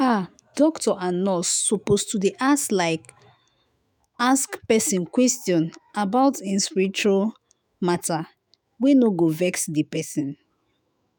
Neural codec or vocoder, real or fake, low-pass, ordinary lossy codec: autoencoder, 48 kHz, 128 numbers a frame, DAC-VAE, trained on Japanese speech; fake; none; none